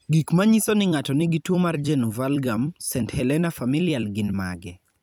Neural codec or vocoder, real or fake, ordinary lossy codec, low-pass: vocoder, 44.1 kHz, 128 mel bands every 256 samples, BigVGAN v2; fake; none; none